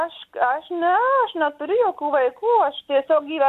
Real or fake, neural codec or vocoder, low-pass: real; none; 14.4 kHz